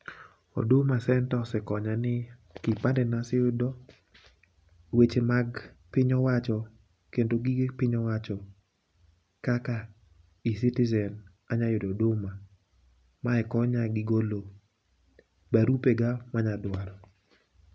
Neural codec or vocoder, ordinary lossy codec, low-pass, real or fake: none; none; none; real